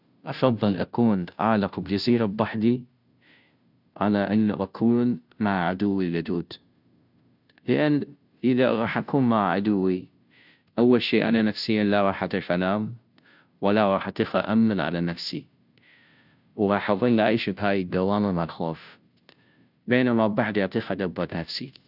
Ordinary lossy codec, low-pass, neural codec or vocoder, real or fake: none; 5.4 kHz; codec, 16 kHz, 0.5 kbps, FunCodec, trained on Chinese and English, 25 frames a second; fake